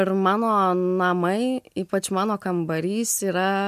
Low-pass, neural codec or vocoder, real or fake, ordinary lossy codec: 14.4 kHz; none; real; MP3, 96 kbps